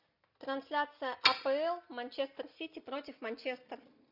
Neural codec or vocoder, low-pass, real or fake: none; 5.4 kHz; real